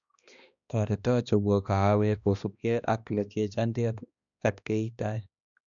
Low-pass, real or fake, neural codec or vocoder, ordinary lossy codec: 7.2 kHz; fake; codec, 16 kHz, 1 kbps, X-Codec, HuBERT features, trained on balanced general audio; none